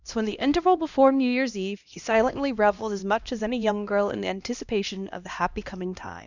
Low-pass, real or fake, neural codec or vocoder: 7.2 kHz; fake; codec, 16 kHz, 1 kbps, X-Codec, HuBERT features, trained on LibriSpeech